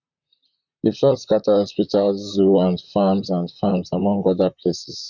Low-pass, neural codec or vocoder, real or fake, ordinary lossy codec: 7.2 kHz; vocoder, 44.1 kHz, 128 mel bands, Pupu-Vocoder; fake; none